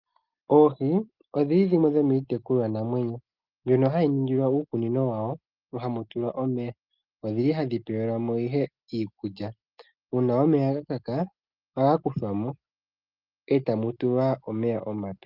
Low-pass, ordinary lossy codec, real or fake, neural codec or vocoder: 5.4 kHz; Opus, 32 kbps; real; none